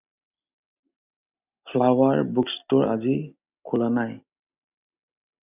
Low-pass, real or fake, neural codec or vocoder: 3.6 kHz; real; none